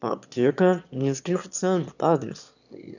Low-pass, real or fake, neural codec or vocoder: 7.2 kHz; fake; autoencoder, 22.05 kHz, a latent of 192 numbers a frame, VITS, trained on one speaker